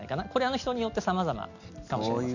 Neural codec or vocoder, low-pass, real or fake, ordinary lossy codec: none; 7.2 kHz; real; none